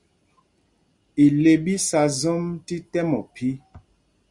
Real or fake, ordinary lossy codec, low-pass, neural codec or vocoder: real; Opus, 64 kbps; 10.8 kHz; none